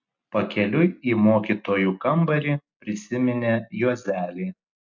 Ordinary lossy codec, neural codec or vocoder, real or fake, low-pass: MP3, 64 kbps; none; real; 7.2 kHz